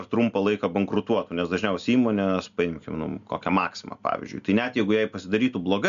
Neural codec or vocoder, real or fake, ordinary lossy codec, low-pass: none; real; AAC, 96 kbps; 7.2 kHz